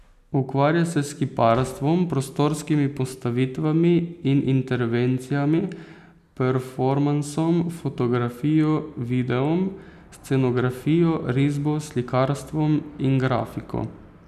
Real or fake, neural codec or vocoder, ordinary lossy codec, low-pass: real; none; none; 14.4 kHz